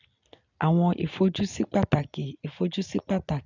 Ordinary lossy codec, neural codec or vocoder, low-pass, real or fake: none; none; 7.2 kHz; real